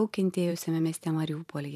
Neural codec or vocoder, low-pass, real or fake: vocoder, 44.1 kHz, 128 mel bands every 512 samples, BigVGAN v2; 14.4 kHz; fake